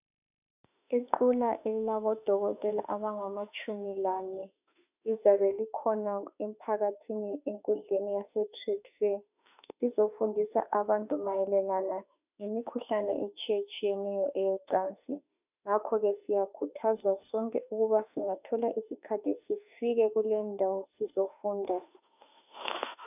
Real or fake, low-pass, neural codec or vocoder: fake; 3.6 kHz; autoencoder, 48 kHz, 32 numbers a frame, DAC-VAE, trained on Japanese speech